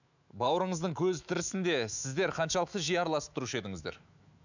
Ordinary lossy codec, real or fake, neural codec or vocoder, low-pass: none; fake; autoencoder, 48 kHz, 128 numbers a frame, DAC-VAE, trained on Japanese speech; 7.2 kHz